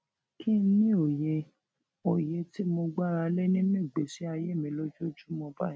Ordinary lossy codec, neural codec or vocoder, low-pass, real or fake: none; none; none; real